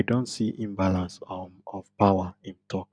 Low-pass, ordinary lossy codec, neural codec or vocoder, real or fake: 9.9 kHz; none; none; real